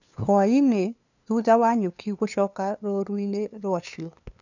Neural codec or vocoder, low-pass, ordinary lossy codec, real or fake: codec, 16 kHz, 2 kbps, FunCodec, trained on LibriTTS, 25 frames a second; 7.2 kHz; none; fake